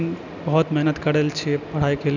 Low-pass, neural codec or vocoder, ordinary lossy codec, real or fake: 7.2 kHz; none; none; real